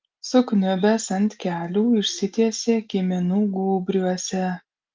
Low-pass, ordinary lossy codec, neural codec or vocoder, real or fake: 7.2 kHz; Opus, 24 kbps; none; real